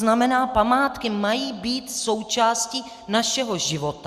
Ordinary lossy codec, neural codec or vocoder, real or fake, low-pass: Opus, 64 kbps; none; real; 14.4 kHz